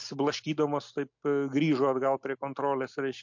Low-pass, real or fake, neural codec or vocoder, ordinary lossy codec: 7.2 kHz; real; none; MP3, 48 kbps